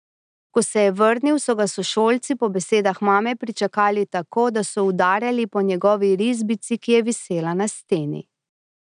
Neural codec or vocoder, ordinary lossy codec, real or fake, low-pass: none; none; real; 9.9 kHz